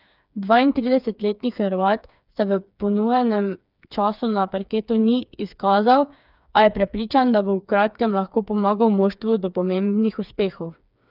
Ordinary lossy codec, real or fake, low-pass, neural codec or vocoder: none; fake; 5.4 kHz; codec, 16 kHz, 4 kbps, FreqCodec, smaller model